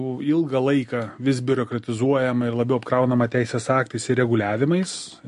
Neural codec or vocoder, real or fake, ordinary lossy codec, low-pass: none; real; MP3, 48 kbps; 10.8 kHz